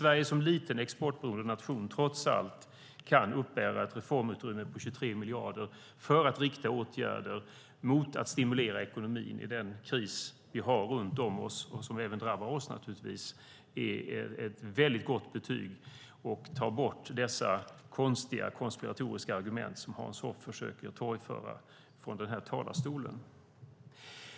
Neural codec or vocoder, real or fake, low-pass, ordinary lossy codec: none; real; none; none